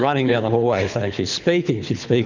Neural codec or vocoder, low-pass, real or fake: codec, 24 kHz, 6 kbps, HILCodec; 7.2 kHz; fake